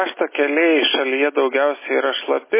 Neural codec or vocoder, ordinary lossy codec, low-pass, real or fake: none; MP3, 16 kbps; 3.6 kHz; real